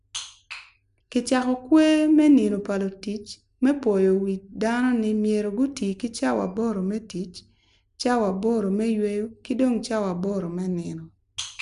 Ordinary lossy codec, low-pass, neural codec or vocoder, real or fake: none; 10.8 kHz; none; real